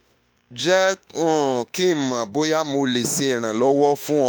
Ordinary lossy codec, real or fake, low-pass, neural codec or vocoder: none; fake; none; autoencoder, 48 kHz, 32 numbers a frame, DAC-VAE, trained on Japanese speech